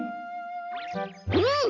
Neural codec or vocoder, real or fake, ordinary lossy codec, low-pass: none; real; none; 7.2 kHz